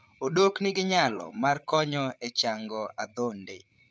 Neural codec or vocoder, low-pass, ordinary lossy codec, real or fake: codec, 16 kHz, 8 kbps, FreqCodec, larger model; none; none; fake